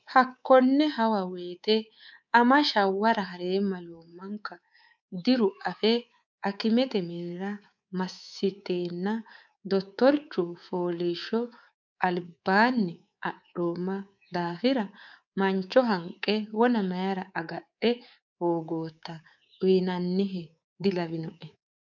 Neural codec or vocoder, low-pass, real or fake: codec, 24 kHz, 3.1 kbps, DualCodec; 7.2 kHz; fake